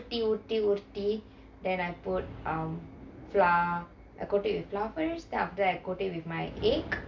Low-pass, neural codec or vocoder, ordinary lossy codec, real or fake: 7.2 kHz; none; MP3, 64 kbps; real